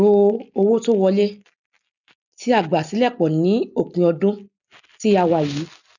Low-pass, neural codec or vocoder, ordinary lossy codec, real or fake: 7.2 kHz; none; none; real